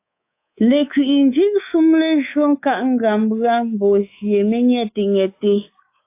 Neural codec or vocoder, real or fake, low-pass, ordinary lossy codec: codec, 24 kHz, 3.1 kbps, DualCodec; fake; 3.6 kHz; AAC, 24 kbps